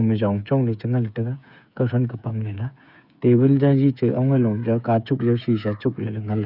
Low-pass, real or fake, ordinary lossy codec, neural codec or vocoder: 5.4 kHz; fake; none; codec, 16 kHz, 8 kbps, FreqCodec, smaller model